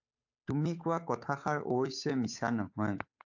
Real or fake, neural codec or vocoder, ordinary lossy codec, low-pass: fake; codec, 16 kHz, 8 kbps, FunCodec, trained on Chinese and English, 25 frames a second; AAC, 48 kbps; 7.2 kHz